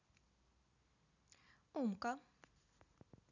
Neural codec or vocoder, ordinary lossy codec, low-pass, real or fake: none; none; 7.2 kHz; real